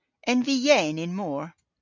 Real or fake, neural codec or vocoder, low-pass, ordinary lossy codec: real; none; 7.2 kHz; MP3, 64 kbps